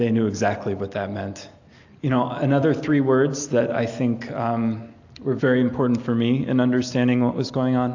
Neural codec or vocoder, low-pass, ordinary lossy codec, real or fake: none; 7.2 kHz; AAC, 48 kbps; real